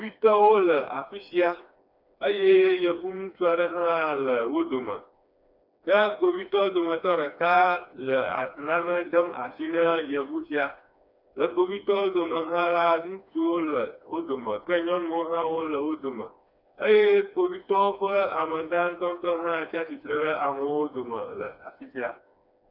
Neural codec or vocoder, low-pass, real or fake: codec, 16 kHz, 2 kbps, FreqCodec, smaller model; 5.4 kHz; fake